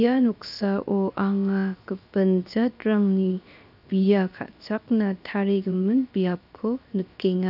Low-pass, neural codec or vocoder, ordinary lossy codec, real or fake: 5.4 kHz; codec, 16 kHz, 0.7 kbps, FocalCodec; none; fake